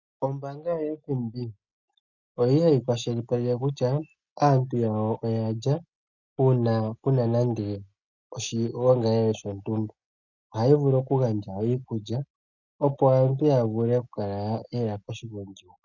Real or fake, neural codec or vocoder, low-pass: real; none; 7.2 kHz